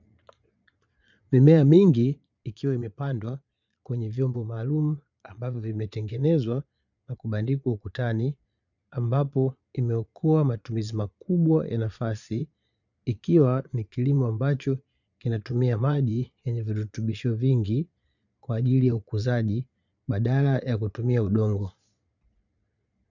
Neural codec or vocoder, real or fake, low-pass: vocoder, 22.05 kHz, 80 mel bands, Vocos; fake; 7.2 kHz